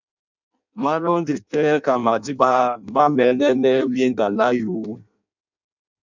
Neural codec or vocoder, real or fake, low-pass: codec, 16 kHz in and 24 kHz out, 0.6 kbps, FireRedTTS-2 codec; fake; 7.2 kHz